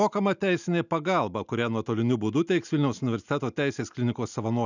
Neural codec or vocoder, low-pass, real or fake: none; 7.2 kHz; real